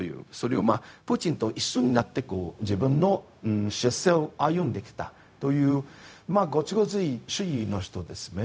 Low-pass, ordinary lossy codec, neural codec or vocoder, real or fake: none; none; codec, 16 kHz, 0.4 kbps, LongCat-Audio-Codec; fake